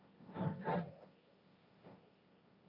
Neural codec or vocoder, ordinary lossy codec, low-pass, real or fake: codec, 16 kHz, 1.1 kbps, Voila-Tokenizer; Opus, 64 kbps; 5.4 kHz; fake